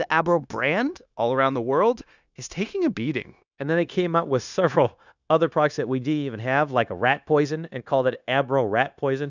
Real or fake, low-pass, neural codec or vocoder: fake; 7.2 kHz; codec, 16 kHz, 0.9 kbps, LongCat-Audio-Codec